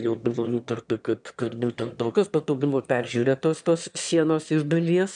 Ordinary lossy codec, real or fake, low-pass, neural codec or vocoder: MP3, 96 kbps; fake; 9.9 kHz; autoencoder, 22.05 kHz, a latent of 192 numbers a frame, VITS, trained on one speaker